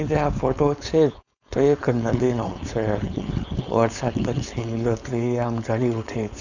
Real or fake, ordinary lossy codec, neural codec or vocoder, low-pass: fake; none; codec, 16 kHz, 4.8 kbps, FACodec; 7.2 kHz